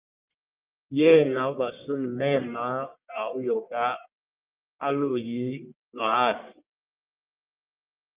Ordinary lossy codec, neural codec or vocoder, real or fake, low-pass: Opus, 24 kbps; codec, 44.1 kHz, 1.7 kbps, Pupu-Codec; fake; 3.6 kHz